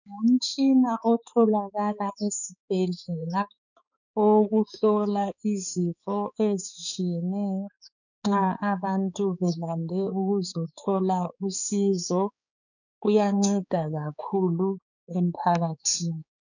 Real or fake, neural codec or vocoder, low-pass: fake; codec, 16 kHz, 4 kbps, X-Codec, HuBERT features, trained on balanced general audio; 7.2 kHz